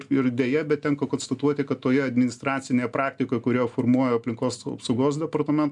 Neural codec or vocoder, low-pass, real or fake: none; 10.8 kHz; real